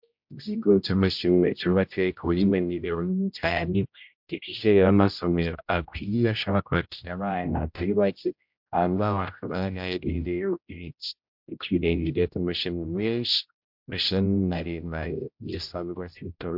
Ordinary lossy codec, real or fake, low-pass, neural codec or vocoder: MP3, 48 kbps; fake; 5.4 kHz; codec, 16 kHz, 0.5 kbps, X-Codec, HuBERT features, trained on general audio